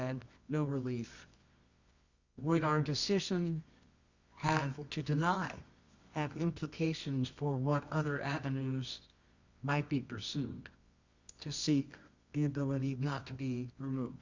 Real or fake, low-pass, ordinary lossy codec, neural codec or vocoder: fake; 7.2 kHz; Opus, 64 kbps; codec, 24 kHz, 0.9 kbps, WavTokenizer, medium music audio release